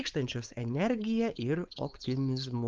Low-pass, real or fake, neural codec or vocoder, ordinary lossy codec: 7.2 kHz; fake; codec, 16 kHz, 4.8 kbps, FACodec; Opus, 32 kbps